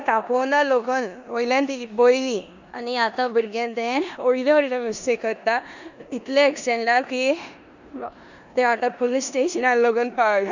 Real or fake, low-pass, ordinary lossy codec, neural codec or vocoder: fake; 7.2 kHz; none; codec, 16 kHz in and 24 kHz out, 0.9 kbps, LongCat-Audio-Codec, four codebook decoder